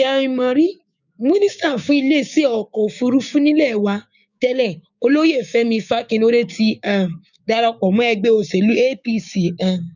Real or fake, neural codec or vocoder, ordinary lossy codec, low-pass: fake; codec, 16 kHz, 6 kbps, DAC; none; 7.2 kHz